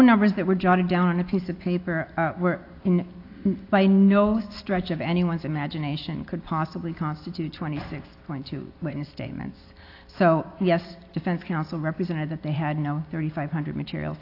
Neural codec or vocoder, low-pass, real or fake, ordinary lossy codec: none; 5.4 kHz; real; AAC, 32 kbps